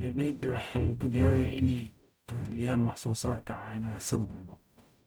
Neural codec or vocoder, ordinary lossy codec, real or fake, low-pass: codec, 44.1 kHz, 0.9 kbps, DAC; none; fake; none